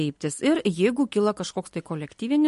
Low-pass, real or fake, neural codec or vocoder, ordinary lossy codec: 14.4 kHz; real; none; MP3, 48 kbps